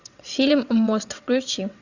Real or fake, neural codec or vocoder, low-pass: fake; vocoder, 22.05 kHz, 80 mel bands, WaveNeXt; 7.2 kHz